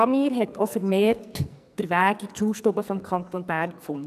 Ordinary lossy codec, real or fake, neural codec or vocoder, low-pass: none; fake; codec, 44.1 kHz, 2.6 kbps, SNAC; 14.4 kHz